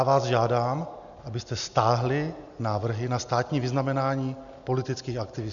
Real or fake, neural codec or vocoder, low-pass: real; none; 7.2 kHz